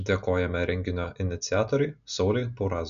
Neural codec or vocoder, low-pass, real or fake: none; 7.2 kHz; real